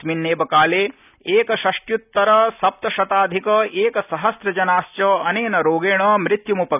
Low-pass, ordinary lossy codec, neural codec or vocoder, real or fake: 3.6 kHz; none; none; real